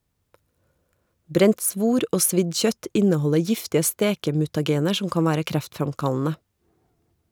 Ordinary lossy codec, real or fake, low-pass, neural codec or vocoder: none; real; none; none